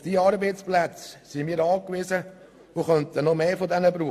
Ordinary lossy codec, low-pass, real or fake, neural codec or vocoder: AAC, 64 kbps; 14.4 kHz; real; none